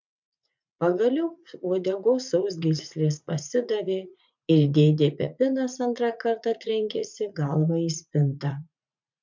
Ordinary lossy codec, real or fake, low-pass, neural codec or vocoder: MP3, 64 kbps; fake; 7.2 kHz; vocoder, 44.1 kHz, 80 mel bands, Vocos